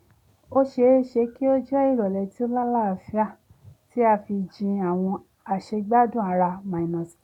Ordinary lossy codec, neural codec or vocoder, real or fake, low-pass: none; none; real; 19.8 kHz